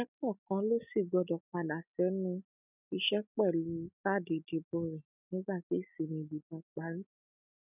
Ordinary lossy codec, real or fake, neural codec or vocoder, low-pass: none; real; none; 3.6 kHz